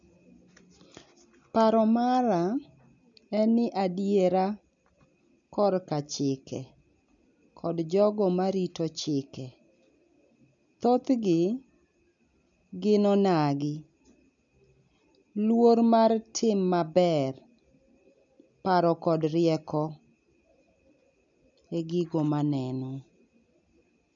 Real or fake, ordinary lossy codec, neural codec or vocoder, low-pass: real; none; none; 7.2 kHz